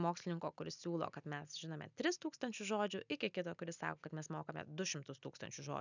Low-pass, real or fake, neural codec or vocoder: 7.2 kHz; real; none